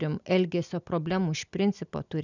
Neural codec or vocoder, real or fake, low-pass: none; real; 7.2 kHz